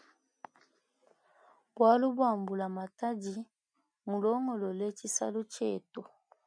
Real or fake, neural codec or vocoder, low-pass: real; none; 9.9 kHz